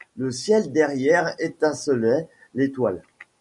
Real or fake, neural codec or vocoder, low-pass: real; none; 10.8 kHz